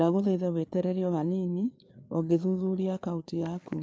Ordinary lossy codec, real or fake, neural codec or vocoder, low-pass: none; fake; codec, 16 kHz, 4 kbps, FreqCodec, larger model; none